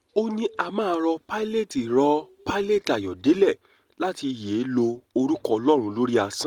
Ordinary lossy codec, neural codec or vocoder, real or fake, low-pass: Opus, 24 kbps; none; real; 19.8 kHz